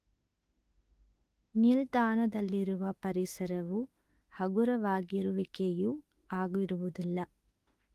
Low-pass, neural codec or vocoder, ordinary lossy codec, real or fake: 14.4 kHz; autoencoder, 48 kHz, 32 numbers a frame, DAC-VAE, trained on Japanese speech; Opus, 24 kbps; fake